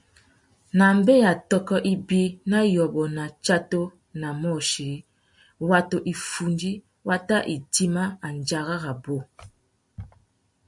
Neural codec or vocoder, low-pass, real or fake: none; 10.8 kHz; real